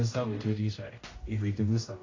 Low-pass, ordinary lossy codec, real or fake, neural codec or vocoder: 7.2 kHz; AAC, 32 kbps; fake; codec, 16 kHz, 0.5 kbps, X-Codec, HuBERT features, trained on general audio